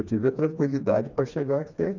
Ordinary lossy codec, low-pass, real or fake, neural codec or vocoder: none; 7.2 kHz; fake; codec, 16 kHz, 2 kbps, FreqCodec, smaller model